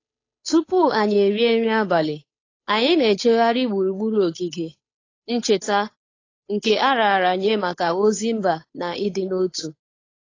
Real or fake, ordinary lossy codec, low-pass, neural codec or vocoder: fake; AAC, 32 kbps; 7.2 kHz; codec, 16 kHz, 8 kbps, FunCodec, trained on Chinese and English, 25 frames a second